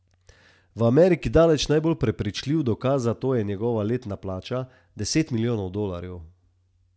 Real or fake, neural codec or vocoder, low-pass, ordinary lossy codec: real; none; none; none